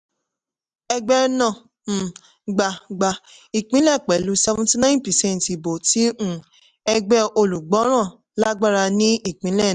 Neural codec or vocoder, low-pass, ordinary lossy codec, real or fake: none; 10.8 kHz; none; real